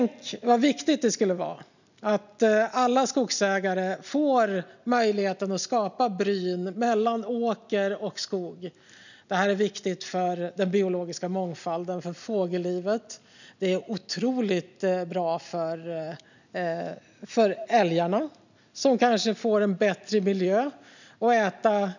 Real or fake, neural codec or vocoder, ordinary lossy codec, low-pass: real; none; none; 7.2 kHz